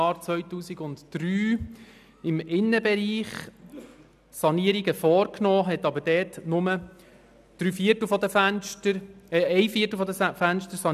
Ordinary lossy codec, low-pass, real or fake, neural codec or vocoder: none; 14.4 kHz; real; none